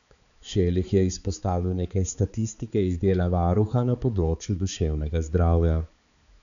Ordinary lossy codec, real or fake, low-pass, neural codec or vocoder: none; fake; 7.2 kHz; codec, 16 kHz, 4 kbps, X-Codec, HuBERT features, trained on balanced general audio